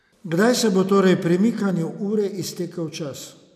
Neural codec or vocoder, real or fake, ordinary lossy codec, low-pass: none; real; none; 14.4 kHz